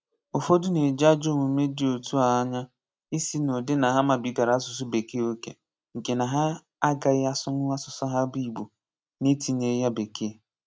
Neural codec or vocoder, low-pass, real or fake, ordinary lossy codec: none; none; real; none